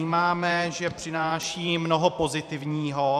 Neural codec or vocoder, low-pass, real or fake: vocoder, 44.1 kHz, 128 mel bands every 512 samples, BigVGAN v2; 14.4 kHz; fake